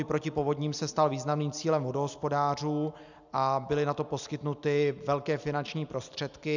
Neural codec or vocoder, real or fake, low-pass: none; real; 7.2 kHz